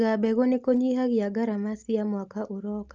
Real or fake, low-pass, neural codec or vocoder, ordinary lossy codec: real; 7.2 kHz; none; Opus, 24 kbps